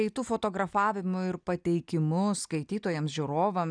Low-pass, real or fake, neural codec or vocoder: 9.9 kHz; real; none